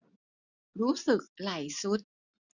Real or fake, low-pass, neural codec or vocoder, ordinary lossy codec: real; 7.2 kHz; none; none